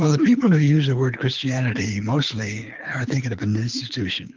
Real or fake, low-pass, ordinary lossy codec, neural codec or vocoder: fake; 7.2 kHz; Opus, 24 kbps; codec, 24 kHz, 6 kbps, HILCodec